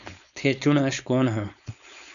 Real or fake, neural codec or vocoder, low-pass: fake; codec, 16 kHz, 4.8 kbps, FACodec; 7.2 kHz